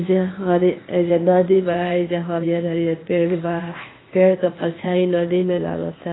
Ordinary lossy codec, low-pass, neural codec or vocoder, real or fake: AAC, 16 kbps; 7.2 kHz; codec, 16 kHz, 0.8 kbps, ZipCodec; fake